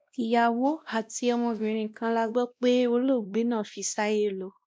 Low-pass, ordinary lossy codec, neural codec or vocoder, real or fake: none; none; codec, 16 kHz, 1 kbps, X-Codec, WavLM features, trained on Multilingual LibriSpeech; fake